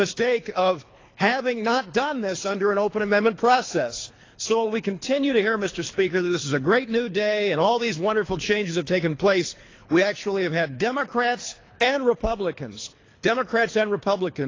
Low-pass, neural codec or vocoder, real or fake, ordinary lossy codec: 7.2 kHz; codec, 24 kHz, 3 kbps, HILCodec; fake; AAC, 32 kbps